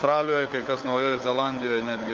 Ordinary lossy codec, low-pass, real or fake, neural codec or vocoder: Opus, 24 kbps; 7.2 kHz; fake; codec, 16 kHz, 4 kbps, FunCodec, trained on LibriTTS, 50 frames a second